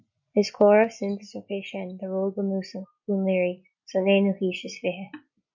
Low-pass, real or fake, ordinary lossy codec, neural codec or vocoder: 7.2 kHz; real; MP3, 48 kbps; none